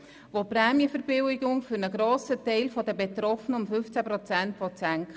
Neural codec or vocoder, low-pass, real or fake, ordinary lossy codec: none; none; real; none